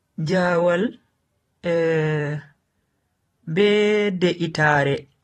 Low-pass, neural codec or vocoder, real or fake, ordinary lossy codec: 19.8 kHz; vocoder, 44.1 kHz, 128 mel bands every 512 samples, BigVGAN v2; fake; AAC, 32 kbps